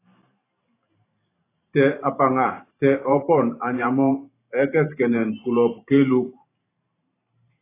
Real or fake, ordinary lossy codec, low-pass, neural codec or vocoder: fake; AAC, 24 kbps; 3.6 kHz; vocoder, 44.1 kHz, 128 mel bands every 512 samples, BigVGAN v2